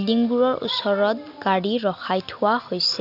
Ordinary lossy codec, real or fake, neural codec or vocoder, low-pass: none; real; none; 5.4 kHz